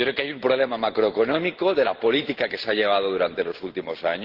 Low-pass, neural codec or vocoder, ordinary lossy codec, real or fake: 5.4 kHz; none; Opus, 16 kbps; real